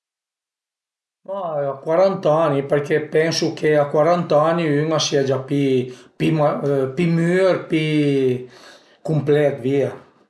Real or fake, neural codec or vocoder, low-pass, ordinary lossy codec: real; none; none; none